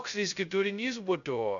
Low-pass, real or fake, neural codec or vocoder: 7.2 kHz; fake; codec, 16 kHz, 0.2 kbps, FocalCodec